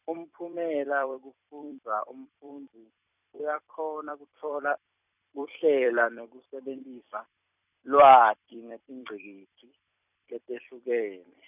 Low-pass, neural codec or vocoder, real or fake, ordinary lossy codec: 3.6 kHz; vocoder, 44.1 kHz, 128 mel bands every 256 samples, BigVGAN v2; fake; none